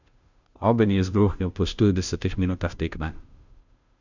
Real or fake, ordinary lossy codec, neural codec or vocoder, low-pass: fake; none; codec, 16 kHz, 0.5 kbps, FunCodec, trained on Chinese and English, 25 frames a second; 7.2 kHz